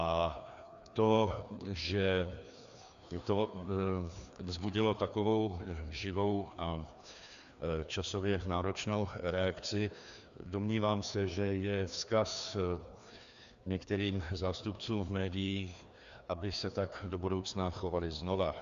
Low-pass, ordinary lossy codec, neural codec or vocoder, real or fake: 7.2 kHz; Opus, 64 kbps; codec, 16 kHz, 2 kbps, FreqCodec, larger model; fake